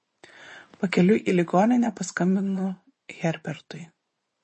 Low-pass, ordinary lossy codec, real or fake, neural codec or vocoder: 10.8 kHz; MP3, 32 kbps; fake; vocoder, 24 kHz, 100 mel bands, Vocos